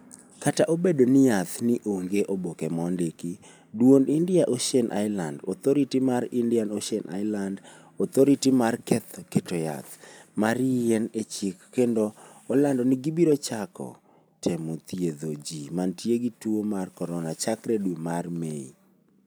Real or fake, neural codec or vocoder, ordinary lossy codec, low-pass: real; none; none; none